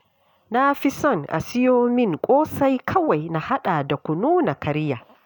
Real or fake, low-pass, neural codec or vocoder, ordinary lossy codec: real; 19.8 kHz; none; none